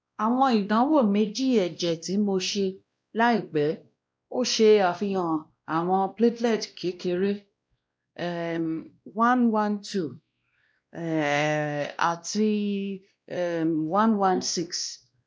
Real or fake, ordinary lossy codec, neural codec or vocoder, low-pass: fake; none; codec, 16 kHz, 1 kbps, X-Codec, WavLM features, trained on Multilingual LibriSpeech; none